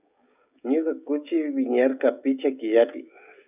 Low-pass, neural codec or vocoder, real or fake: 3.6 kHz; codec, 16 kHz, 16 kbps, FreqCodec, smaller model; fake